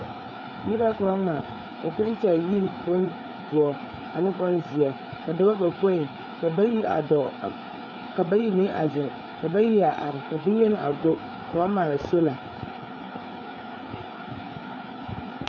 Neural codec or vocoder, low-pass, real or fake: codec, 16 kHz, 4 kbps, FreqCodec, larger model; 7.2 kHz; fake